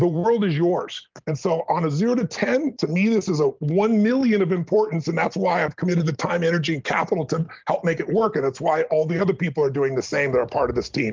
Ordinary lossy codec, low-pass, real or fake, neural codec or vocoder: Opus, 32 kbps; 7.2 kHz; real; none